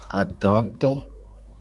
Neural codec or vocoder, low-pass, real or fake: codec, 24 kHz, 1 kbps, SNAC; 10.8 kHz; fake